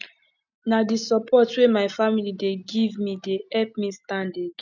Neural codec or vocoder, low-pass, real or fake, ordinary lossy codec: none; 7.2 kHz; real; none